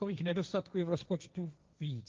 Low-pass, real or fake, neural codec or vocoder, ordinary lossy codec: 7.2 kHz; fake; codec, 16 kHz, 1.1 kbps, Voila-Tokenizer; Opus, 32 kbps